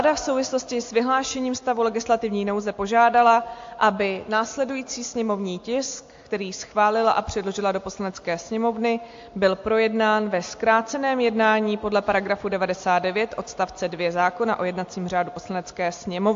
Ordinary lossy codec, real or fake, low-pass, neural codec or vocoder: AAC, 48 kbps; real; 7.2 kHz; none